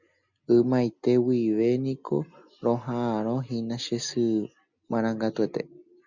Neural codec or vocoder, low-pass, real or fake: none; 7.2 kHz; real